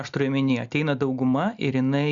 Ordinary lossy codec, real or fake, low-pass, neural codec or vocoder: Opus, 64 kbps; real; 7.2 kHz; none